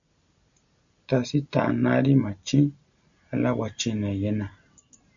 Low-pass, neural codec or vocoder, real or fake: 7.2 kHz; none; real